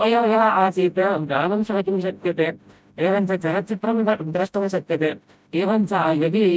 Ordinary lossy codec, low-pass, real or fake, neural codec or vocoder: none; none; fake; codec, 16 kHz, 0.5 kbps, FreqCodec, smaller model